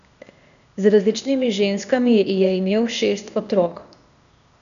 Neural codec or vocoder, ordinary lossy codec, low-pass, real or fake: codec, 16 kHz, 0.8 kbps, ZipCodec; none; 7.2 kHz; fake